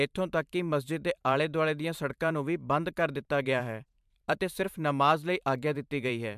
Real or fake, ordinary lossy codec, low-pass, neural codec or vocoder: fake; MP3, 96 kbps; 14.4 kHz; vocoder, 44.1 kHz, 128 mel bands every 512 samples, BigVGAN v2